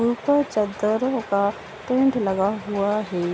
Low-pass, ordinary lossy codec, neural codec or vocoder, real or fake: none; none; none; real